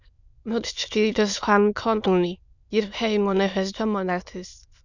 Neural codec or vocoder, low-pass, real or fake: autoencoder, 22.05 kHz, a latent of 192 numbers a frame, VITS, trained on many speakers; 7.2 kHz; fake